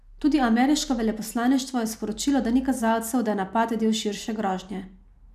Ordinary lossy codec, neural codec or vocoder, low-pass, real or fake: none; none; 14.4 kHz; real